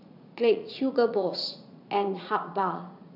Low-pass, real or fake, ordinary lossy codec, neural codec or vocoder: 5.4 kHz; fake; none; vocoder, 44.1 kHz, 80 mel bands, Vocos